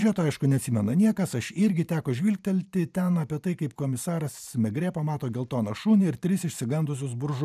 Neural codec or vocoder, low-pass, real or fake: vocoder, 44.1 kHz, 128 mel bands every 512 samples, BigVGAN v2; 14.4 kHz; fake